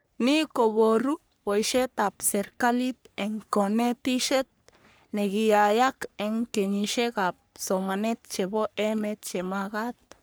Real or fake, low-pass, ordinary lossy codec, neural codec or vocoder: fake; none; none; codec, 44.1 kHz, 3.4 kbps, Pupu-Codec